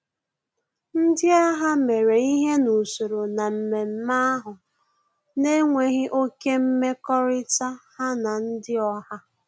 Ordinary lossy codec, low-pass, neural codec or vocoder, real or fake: none; none; none; real